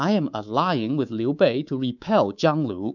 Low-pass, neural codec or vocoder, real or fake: 7.2 kHz; codec, 24 kHz, 3.1 kbps, DualCodec; fake